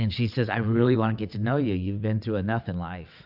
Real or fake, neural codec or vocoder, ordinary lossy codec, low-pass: fake; vocoder, 22.05 kHz, 80 mel bands, WaveNeXt; AAC, 48 kbps; 5.4 kHz